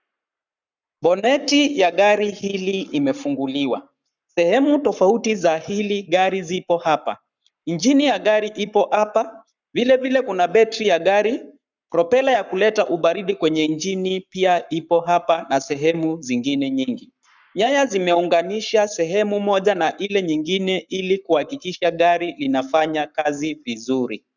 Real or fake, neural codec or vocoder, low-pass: fake; codec, 44.1 kHz, 7.8 kbps, Pupu-Codec; 7.2 kHz